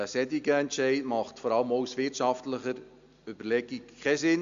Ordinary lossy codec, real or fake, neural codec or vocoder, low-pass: Opus, 64 kbps; real; none; 7.2 kHz